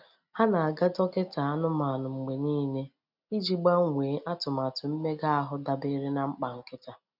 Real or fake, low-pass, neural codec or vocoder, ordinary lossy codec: real; 5.4 kHz; none; none